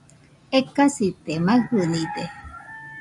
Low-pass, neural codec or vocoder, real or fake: 10.8 kHz; none; real